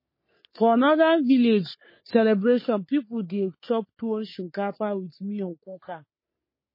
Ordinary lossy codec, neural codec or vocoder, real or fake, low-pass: MP3, 24 kbps; codec, 44.1 kHz, 3.4 kbps, Pupu-Codec; fake; 5.4 kHz